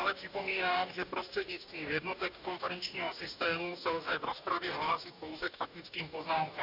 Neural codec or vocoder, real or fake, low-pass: codec, 44.1 kHz, 2.6 kbps, DAC; fake; 5.4 kHz